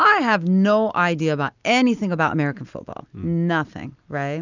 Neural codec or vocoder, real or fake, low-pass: none; real; 7.2 kHz